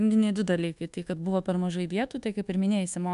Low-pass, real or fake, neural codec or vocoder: 10.8 kHz; fake; codec, 24 kHz, 1.2 kbps, DualCodec